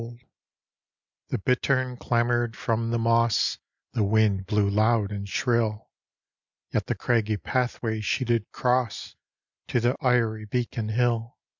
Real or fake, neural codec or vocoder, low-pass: real; none; 7.2 kHz